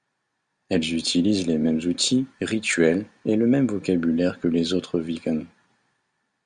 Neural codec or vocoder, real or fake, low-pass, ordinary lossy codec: none; real; 9.9 kHz; Opus, 64 kbps